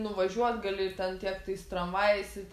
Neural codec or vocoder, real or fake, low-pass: none; real; 14.4 kHz